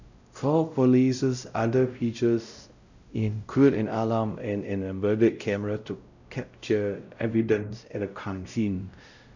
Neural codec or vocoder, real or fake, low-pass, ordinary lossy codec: codec, 16 kHz, 0.5 kbps, X-Codec, WavLM features, trained on Multilingual LibriSpeech; fake; 7.2 kHz; none